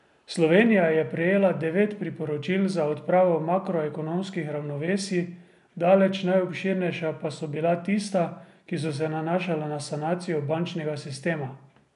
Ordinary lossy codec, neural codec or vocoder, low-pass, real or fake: none; none; 10.8 kHz; real